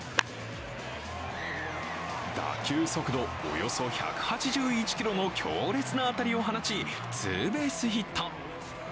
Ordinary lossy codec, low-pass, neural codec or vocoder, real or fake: none; none; none; real